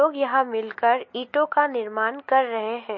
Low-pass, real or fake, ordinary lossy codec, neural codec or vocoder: 7.2 kHz; real; MP3, 32 kbps; none